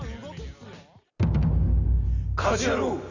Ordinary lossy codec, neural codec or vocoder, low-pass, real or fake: none; none; 7.2 kHz; real